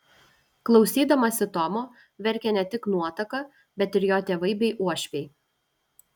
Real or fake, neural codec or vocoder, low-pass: real; none; 19.8 kHz